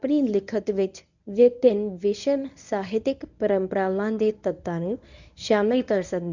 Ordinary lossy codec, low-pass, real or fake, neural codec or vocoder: none; 7.2 kHz; fake; codec, 24 kHz, 0.9 kbps, WavTokenizer, medium speech release version 1